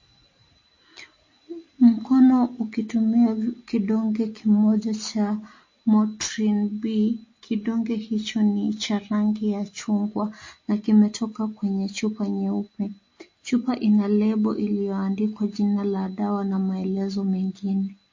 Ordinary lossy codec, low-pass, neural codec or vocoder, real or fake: MP3, 32 kbps; 7.2 kHz; none; real